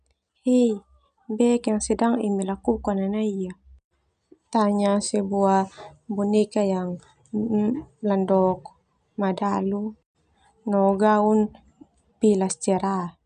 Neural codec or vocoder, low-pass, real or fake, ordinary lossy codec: none; 9.9 kHz; real; none